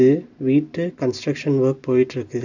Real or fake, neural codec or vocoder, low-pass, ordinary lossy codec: real; none; 7.2 kHz; none